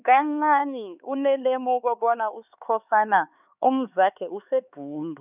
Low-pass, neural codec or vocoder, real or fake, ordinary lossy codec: 3.6 kHz; codec, 16 kHz, 4 kbps, X-Codec, HuBERT features, trained on LibriSpeech; fake; none